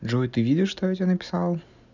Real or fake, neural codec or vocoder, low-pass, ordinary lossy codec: real; none; 7.2 kHz; none